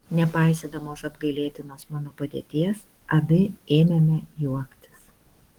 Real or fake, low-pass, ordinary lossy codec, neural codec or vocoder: fake; 19.8 kHz; Opus, 24 kbps; codec, 44.1 kHz, 7.8 kbps, DAC